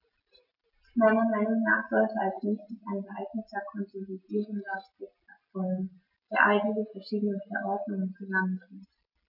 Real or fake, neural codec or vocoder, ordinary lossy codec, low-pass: real; none; none; 5.4 kHz